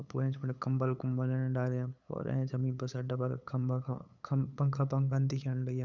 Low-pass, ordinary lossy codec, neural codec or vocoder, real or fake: 7.2 kHz; none; codec, 16 kHz, 8 kbps, FunCodec, trained on LibriTTS, 25 frames a second; fake